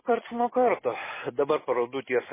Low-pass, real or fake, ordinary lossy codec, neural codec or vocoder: 3.6 kHz; real; MP3, 16 kbps; none